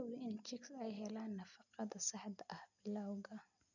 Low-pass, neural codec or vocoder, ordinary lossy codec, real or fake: 7.2 kHz; none; none; real